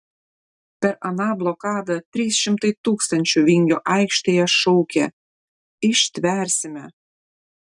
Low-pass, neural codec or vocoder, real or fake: 10.8 kHz; none; real